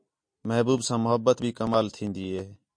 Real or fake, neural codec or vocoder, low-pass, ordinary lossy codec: real; none; 9.9 kHz; MP3, 48 kbps